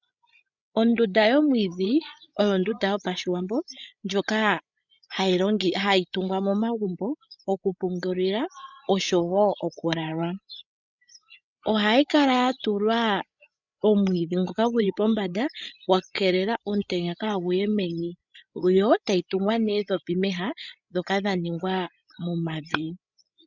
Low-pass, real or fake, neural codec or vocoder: 7.2 kHz; fake; codec, 16 kHz, 8 kbps, FreqCodec, larger model